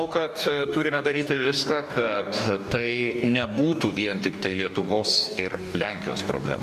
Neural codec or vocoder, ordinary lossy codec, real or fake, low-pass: codec, 44.1 kHz, 2.6 kbps, DAC; MP3, 96 kbps; fake; 14.4 kHz